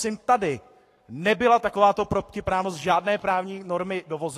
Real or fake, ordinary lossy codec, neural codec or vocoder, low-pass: fake; AAC, 48 kbps; codec, 44.1 kHz, 7.8 kbps, Pupu-Codec; 14.4 kHz